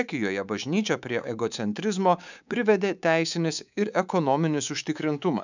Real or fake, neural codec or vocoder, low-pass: fake; codec, 24 kHz, 3.1 kbps, DualCodec; 7.2 kHz